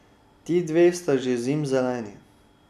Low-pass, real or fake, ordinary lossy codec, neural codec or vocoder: 14.4 kHz; real; none; none